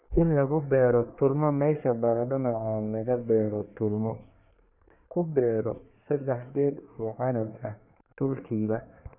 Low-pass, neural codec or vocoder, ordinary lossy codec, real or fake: 3.6 kHz; codec, 24 kHz, 1 kbps, SNAC; none; fake